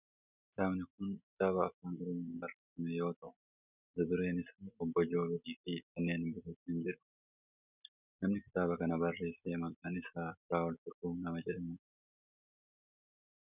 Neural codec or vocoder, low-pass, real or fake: none; 3.6 kHz; real